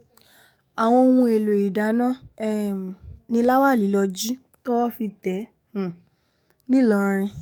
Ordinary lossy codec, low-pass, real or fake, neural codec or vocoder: none; 19.8 kHz; fake; codec, 44.1 kHz, 7.8 kbps, DAC